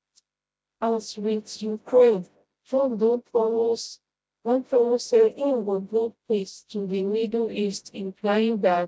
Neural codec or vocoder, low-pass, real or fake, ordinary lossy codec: codec, 16 kHz, 0.5 kbps, FreqCodec, smaller model; none; fake; none